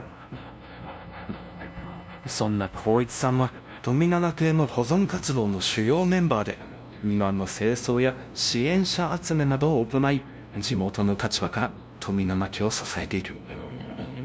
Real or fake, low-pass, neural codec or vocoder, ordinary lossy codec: fake; none; codec, 16 kHz, 0.5 kbps, FunCodec, trained on LibriTTS, 25 frames a second; none